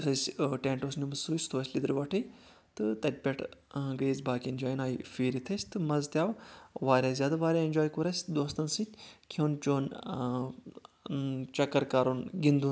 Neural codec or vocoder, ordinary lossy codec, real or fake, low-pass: none; none; real; none